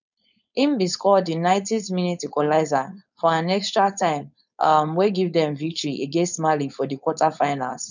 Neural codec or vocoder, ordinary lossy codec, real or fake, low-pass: codec, 16 kHz, 4.8 kbps, FACodec; none; fake; 7.2 kHz